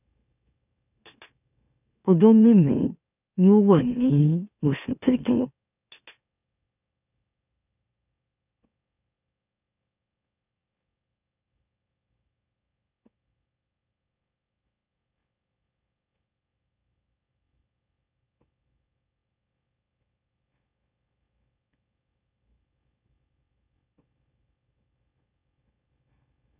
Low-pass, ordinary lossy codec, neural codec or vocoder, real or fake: 3.6 kHz; none; autoencoder, 44.1 kHz, a latent of 192 numbers a frame, MeloTTS; fake